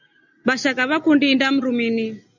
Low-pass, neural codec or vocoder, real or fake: 7.2 kHz; none; real